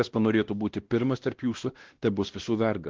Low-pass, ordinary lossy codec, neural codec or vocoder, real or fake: 7.2 kHz; Opus, 16 kbps; codec, 16 kHz, 1 kbps, X-Codec, WavLM features, trained on Multilingual LibriSpeech; fake